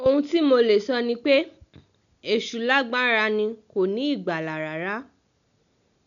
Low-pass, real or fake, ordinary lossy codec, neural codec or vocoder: 7.2 kHz; real; none; none